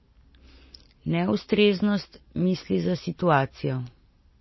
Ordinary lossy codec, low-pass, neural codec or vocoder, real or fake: MP3, 24 kbps; 7.2 kHz; none; real